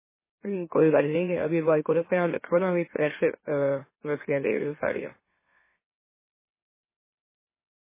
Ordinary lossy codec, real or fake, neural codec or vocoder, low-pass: MP3, 16 kbps; fake; autoencoder, 44.1 kHz, a latent of 192 numbers a frame, MeloTTS; 3.6 kHz